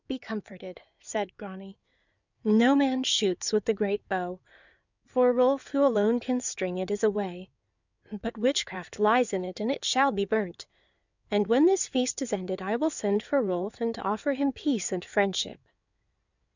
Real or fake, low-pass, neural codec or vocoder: fake; 7.2 kHz; codec, 16 kHz in and 24 kHz out, 2.2 kbps, FireRedTTS-2 codec